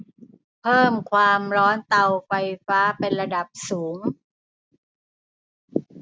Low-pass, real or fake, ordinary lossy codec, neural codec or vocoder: none; real; none; none